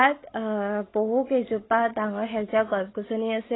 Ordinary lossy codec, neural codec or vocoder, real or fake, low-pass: AAC, 16 kbps; none; real; 7.2 kHz